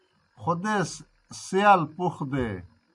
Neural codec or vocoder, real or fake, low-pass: none; real; 10.8 kHz